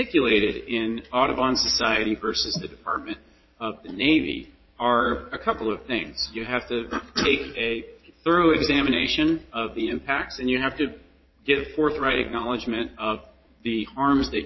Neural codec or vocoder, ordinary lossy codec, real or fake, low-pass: vocoder, 22.05 kHz, 80 mel bands, Vocos; MP3, 24 kbps; fake; 7.2 kHz